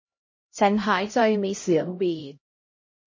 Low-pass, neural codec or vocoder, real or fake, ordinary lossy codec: 7.2 kHz; codec, 16 kHz, 0.5 kbps, X-Codec, HuBERT features, trained on LibriSpeech; fake; MP3, 32 kbps